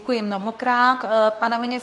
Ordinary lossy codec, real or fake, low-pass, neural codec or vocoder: MP3, 64 kbps; fake; 10.8 kHz; codec, 24 kHz, 0.9 kbps, WavTokenizer, medium speech release version 2